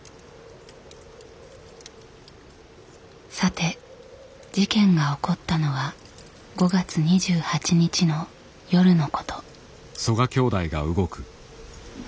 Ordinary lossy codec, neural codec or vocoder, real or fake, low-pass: none; none; real; none